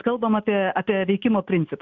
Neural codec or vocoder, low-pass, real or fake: none; 7.2 kHz; real